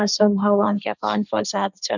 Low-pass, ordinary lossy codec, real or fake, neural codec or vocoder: 7.2 kHz; none; fake; codec, 16 kHz, 1.1 kbps, Voila-Tokenizer